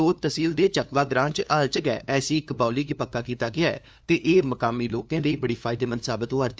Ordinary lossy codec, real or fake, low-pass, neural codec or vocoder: none; fake; none; codec, 16 kHz, 2 kbps, FunCodec, trained on LibriTTS, 25 frames a second